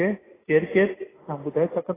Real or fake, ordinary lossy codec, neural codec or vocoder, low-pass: real; AAC, 16 kbps; none; 3.6 kHz